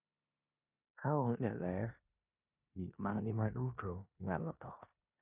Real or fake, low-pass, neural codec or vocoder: fake; 3.6 kHz; codec, 16 kHz in and 24 kHz out, 0.9 kbps, LongCat-Audio-Codec, four codebook decoder